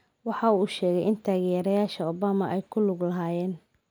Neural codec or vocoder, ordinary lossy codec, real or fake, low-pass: none; none; real; none